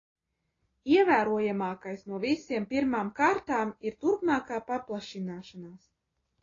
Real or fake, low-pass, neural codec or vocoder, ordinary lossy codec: real; 7.2 kHz; none; AAC, 32 kbps